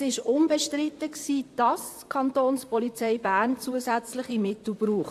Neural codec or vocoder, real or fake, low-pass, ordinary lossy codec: vocoder, 44.1 kHz, 128 mel bands, Pupu-Vocoder; fake; 14.4 kHz; AAC, 64 kbps